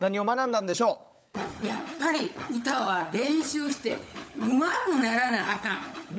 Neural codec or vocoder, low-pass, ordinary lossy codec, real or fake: codec, 16 kHz, 16 kbps, FunCodec, trained on LibriTTS, 50 frames a second; none; none; fake